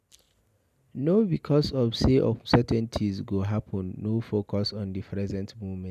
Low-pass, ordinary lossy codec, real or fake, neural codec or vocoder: 14.4 kHz; none; real; none